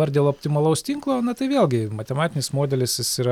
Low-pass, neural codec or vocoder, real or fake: 19.8 kHz; none; real